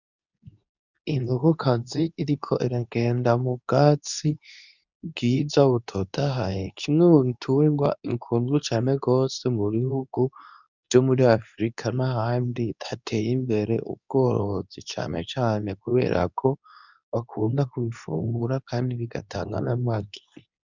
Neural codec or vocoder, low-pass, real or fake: codec, 24 kHz, 0.9 kbps, WavTokenizer, medium speech release version 2; 7.2 kHz; fake